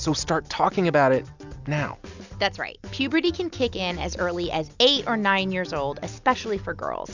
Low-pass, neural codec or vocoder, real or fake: 7.2 kHz; none; real